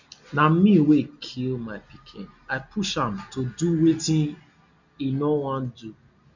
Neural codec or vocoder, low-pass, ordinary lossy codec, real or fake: none; 7.2 kHz; none; real